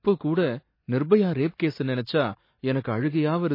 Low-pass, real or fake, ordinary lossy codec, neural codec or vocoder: 5.4 kHz; real; MP3, 24 kbps; none